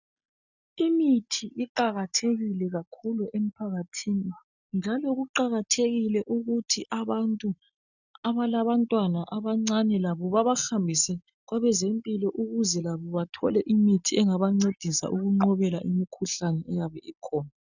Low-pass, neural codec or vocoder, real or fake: 7.2 kHz; none; real